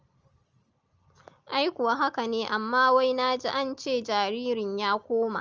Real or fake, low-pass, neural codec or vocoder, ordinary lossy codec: real; 7.2 kHz; none; Opus, 24 kbps